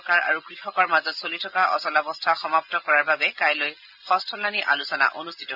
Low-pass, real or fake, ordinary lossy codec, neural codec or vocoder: 5.4 kHz; real; none; none